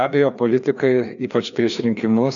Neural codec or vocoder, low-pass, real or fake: codec, 16 kHz, 2 kbps, FreqCodec, larger model; 7.2 kHz; fake